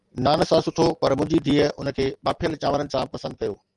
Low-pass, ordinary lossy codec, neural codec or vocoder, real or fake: 10.8 kHz; Opus, 32 kbps; none; real